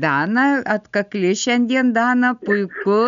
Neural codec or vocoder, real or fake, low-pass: none; real; 7.2 kHz